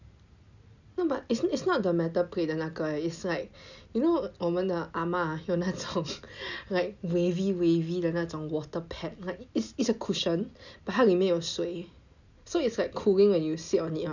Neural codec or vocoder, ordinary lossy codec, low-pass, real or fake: none; none; 7.2 kHz; real